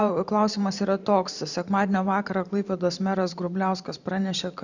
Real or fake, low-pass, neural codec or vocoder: fake; 7.2 kHz; vocoder, 44.1 kHz, 128 mel bands every 512 samples, BigVGAN v2